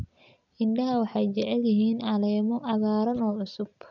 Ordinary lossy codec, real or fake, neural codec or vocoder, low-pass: none; real; none; 7.2 kHz